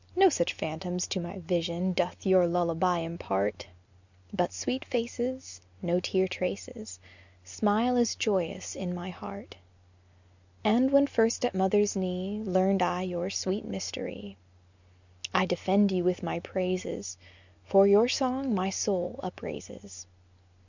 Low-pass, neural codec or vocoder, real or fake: 7.2 kHz; none; real